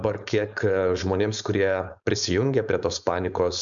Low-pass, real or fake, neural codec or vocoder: 7.2 kHz; fake; codec, 16 kHz, 4.8 kbps, FACodec